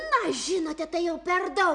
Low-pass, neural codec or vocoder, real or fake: 9.9 kHz; none; real